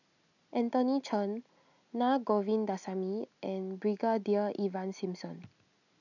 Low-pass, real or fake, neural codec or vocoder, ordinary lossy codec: 7.2 kHz; real; none; none